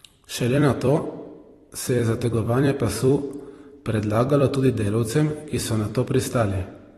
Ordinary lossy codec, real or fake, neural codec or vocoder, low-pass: AAC, 32 kbps; fake; vocoder, 44.1 kHz, 128 mel bands every 256 samples, BigVGAN v2; 19.8 kHz